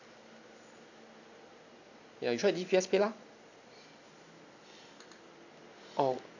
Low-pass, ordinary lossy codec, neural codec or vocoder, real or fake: 7.2 kHz; none; none; real